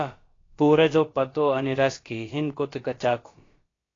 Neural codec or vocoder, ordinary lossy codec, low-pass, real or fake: codec, 16 kHz, about 1 kbps, DyCAST, with the encoder's durations; AAC, 32 kbps; 7.2 kHz; fake